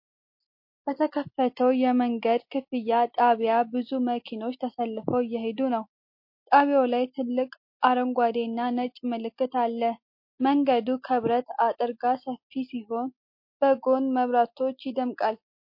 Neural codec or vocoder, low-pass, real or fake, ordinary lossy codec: none; 5.4 kHz; real; MP3, 32 kbps